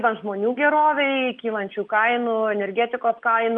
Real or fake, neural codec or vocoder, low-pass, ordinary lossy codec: real; none; 9.9 kHz; Opus, 32 kbps